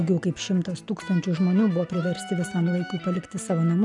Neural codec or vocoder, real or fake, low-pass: none; real; 10.8 kHz